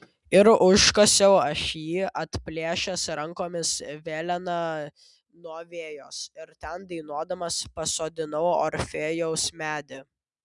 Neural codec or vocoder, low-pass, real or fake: none; 10.8 kHz; real